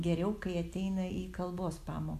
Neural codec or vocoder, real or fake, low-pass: none; real; 14.4 kHz